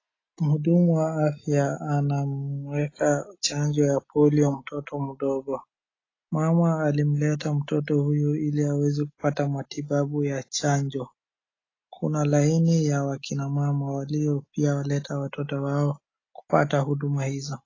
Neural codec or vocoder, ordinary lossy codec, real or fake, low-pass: none; AAC, 32 kbps; real; 7.2 kHz